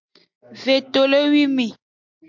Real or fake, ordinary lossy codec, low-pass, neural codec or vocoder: real; MP3, 64 kbps; 7.2 kHz; none